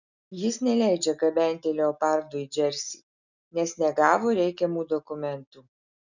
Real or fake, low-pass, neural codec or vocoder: real; 7.2 kHz; none